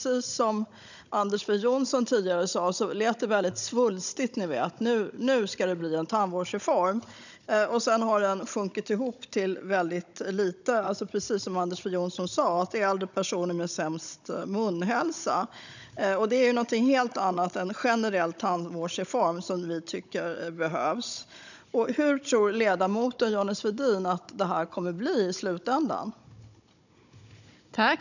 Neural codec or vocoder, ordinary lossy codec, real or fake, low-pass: codec, 16 kHz, 16 kbps, FunCodec, trained on Chinese and English, 50 frames a second; none; fake; 7.2 kHz